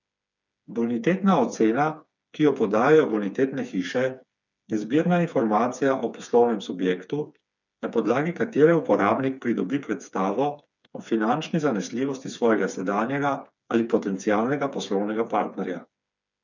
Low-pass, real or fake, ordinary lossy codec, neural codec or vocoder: 7.2 kHz; fake; none; codec, 16 kHz, 4 kbps, FreqCodec, smaller model